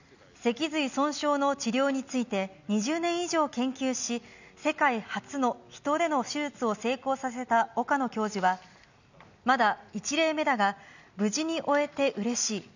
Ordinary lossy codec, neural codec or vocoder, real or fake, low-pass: none; none; real; 7.2 kHz